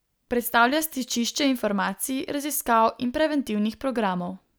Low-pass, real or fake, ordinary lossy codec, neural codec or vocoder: none; real; none; none